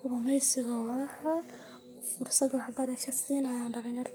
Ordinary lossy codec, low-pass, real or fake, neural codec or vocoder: none; none; fake; codec, 44.1 kHz, 3.4 kbps, Pupu-Codec